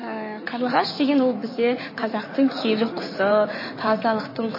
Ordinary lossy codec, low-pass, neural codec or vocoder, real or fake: MP3, 24 kbps; 5.4 kHz; codec, 16 kHz in and 24 kHz out, 2.2 kbps, FireRedTTS-2 codec; fake